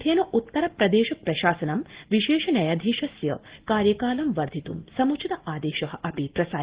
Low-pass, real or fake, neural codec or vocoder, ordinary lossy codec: 3.6 kHz; real; none; Opus, 16 kbps